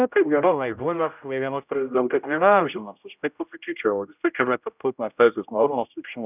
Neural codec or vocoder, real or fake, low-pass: codec, 16 kHz, 0.5 kbps, X-Codec, HuBERT features, trained on general audio; fake; 3.6 kHz